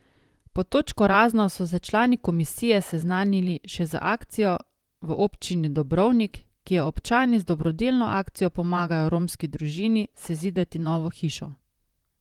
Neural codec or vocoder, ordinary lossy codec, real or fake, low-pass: vocoder, 44.1 kHz, 128 mel bands, Pupu-Vocoder; Opus, 24 kbps; fake; 19.8 kHz